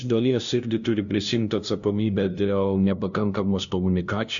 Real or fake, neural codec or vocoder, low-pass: fake; codec, 16 kHz, 0.5 kbps, FunCodec, trained on LibriTTS, 25 frames a second; 7.2 kHz